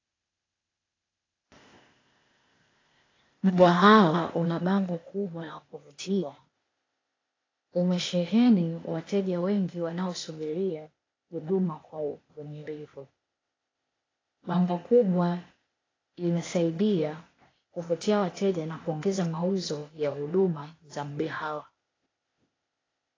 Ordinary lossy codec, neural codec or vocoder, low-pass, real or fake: AAC, 32 kbps; codec, 16 kHz, 0.8 kbps, ZipCodec; 7.2 kHz; fake